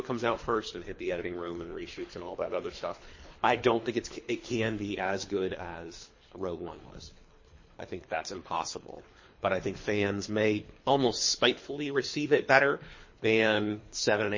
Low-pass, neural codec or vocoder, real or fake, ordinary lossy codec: 7.2 kHz; codec, 24 kHz, 3 kbps, HILCodec; fake; MP3, 32 kbps